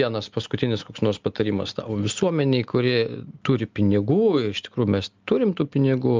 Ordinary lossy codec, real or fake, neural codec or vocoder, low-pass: Opus, 24 kbps; real; none; 7.2 kHz